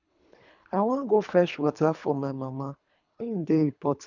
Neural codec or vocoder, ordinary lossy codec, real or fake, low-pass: codec, 24 kHz, 3 kbps, HILCodec; none; fake; 7.2 kHz